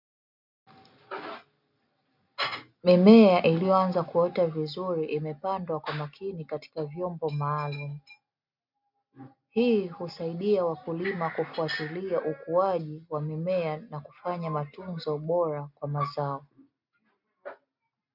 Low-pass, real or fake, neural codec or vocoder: 5.4 kHz; real; none